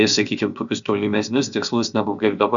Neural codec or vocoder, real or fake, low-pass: codec, 16 kHz, 0.7 kbps, FocalCodec; fake; 7.2 kHz